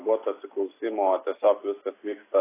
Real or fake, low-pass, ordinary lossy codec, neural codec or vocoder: real; 3.6 kHz; AAC, 16 kbps; none